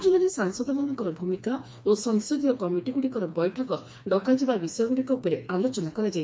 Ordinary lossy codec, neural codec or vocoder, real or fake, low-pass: none; codec, 16 kHz, 2 kbps, FreqCodec, smaller model; fake; none